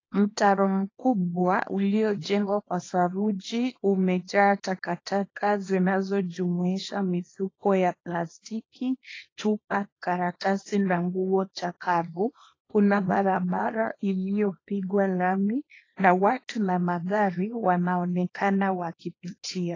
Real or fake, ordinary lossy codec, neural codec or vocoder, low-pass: fake; AAC, 32 kbps; codec, 24 kHz, 0.9 kbps, WavTokenizer, small release; 7.2 kHz